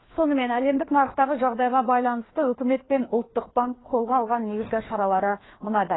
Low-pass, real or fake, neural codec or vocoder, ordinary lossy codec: 7.2 kHz; fake; codec, 16 kHz, 2 kbps, FreqCodec, larger model; AAC, 16 kbps